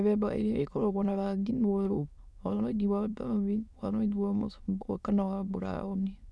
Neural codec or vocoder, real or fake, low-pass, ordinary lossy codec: autoencoder, 22.05 kHz, a latent of 192 numbers a frame, VITS, trained on many speakers; fake; none; none